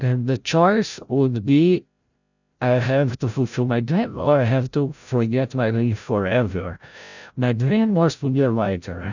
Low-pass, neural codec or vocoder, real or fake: 7.2 kHz; codec, 16 kHz, 0.5 kbps, FreqCodec, larger model; fake